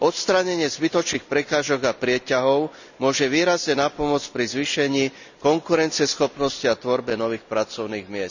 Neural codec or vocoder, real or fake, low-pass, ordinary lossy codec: none; real; 7.2 kHz; none